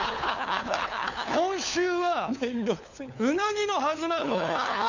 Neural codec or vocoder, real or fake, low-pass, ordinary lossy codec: codec, 16 kHz, 4 kbps, FunCodec, trained on LibriTTS, 50 frames a second; fake; 7.2 kHz; none